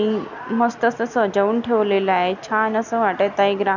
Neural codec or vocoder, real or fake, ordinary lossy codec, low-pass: none; real; none; 7.2 kHz